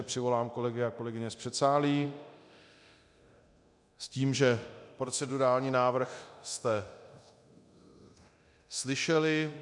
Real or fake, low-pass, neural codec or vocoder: fake; 10.8 kHz; codec, 24 kHz, 0.9 kbps, DualCodec